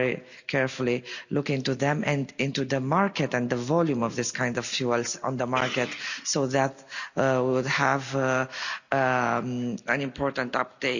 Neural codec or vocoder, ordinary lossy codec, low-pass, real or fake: none; none; 7.2 kHz; real